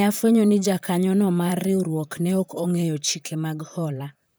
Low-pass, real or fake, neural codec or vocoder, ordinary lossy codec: none; fake; vocoder, 44.1 kHz, 128 mel bands, Pupu-Vocoder; none